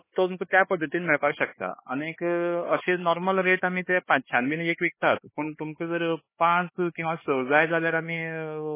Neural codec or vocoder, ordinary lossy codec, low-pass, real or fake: codec, 16 kHz, 4 kbps, X-Codec, HuBERT features, trained on LibriSpeech; MP3, 16 kbps; 3.6 kHz; fake